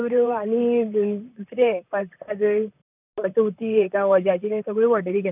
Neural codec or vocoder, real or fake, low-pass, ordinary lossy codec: vocoder, 44.1 kHz, 128 mel bands, Pupu-Vocoder; fake; 3.6 kHz; none